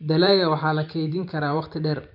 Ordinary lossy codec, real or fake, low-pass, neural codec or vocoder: none; fake; 5.4 kHz; vocoder, 44.1 kHz, 128 mel bands every 512 samples, BigVGAN v2